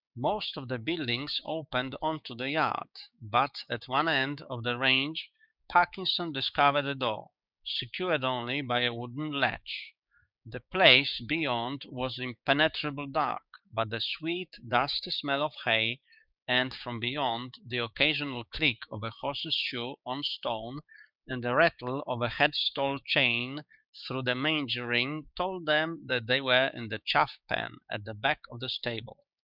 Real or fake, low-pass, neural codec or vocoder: fake; 5.4 kHz; codec, 16 kHz, 6 kbps, DAC